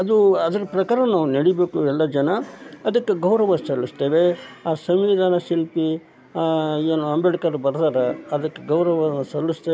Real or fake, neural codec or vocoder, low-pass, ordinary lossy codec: real; none; none; none